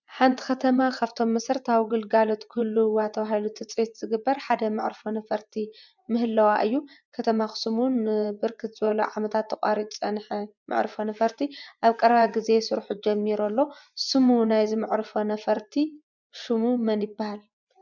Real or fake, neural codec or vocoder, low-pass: fake; vocoder, 24 kHz, 100 mel bands, Vocos; 7.2 kHz